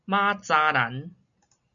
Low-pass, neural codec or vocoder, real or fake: 7.2 kHz; none; real